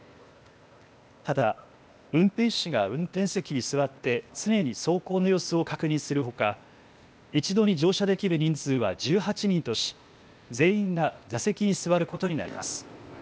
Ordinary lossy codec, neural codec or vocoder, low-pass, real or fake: none; codec, 16 kHz, 0.8 kbps, ZipCodec; none; fake